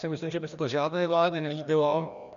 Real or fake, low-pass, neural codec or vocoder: fake; 7.2 kHz; codec, 16 kHz, 1 kbps, FreqCodec, larger model